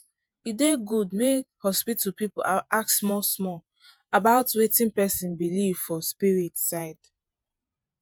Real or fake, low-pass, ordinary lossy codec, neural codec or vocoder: fake; none; none; vocoder, 48 kHz, 128 mel bands, Vocos